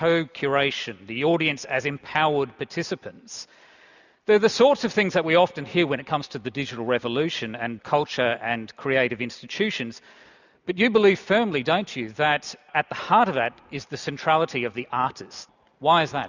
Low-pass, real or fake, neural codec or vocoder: 7.2 kHz; real; none